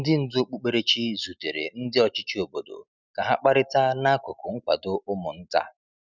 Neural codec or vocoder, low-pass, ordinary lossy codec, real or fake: none; 7.2 kHz; none; real